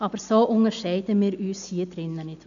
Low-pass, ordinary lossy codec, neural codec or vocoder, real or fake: 7.2 kHz; none; none; real